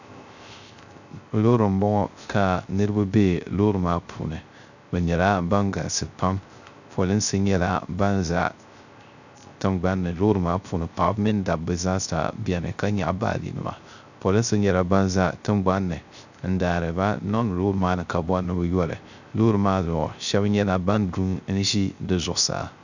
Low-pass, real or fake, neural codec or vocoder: 7.2 kHz; fake; codec, 16 kHz, 0.3 kbps, FocalCodec